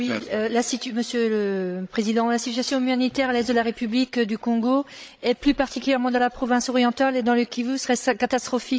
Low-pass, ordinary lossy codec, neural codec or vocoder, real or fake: none; none; codec, 16 kHz, 16 kbps, FreqCodec, larger model; fake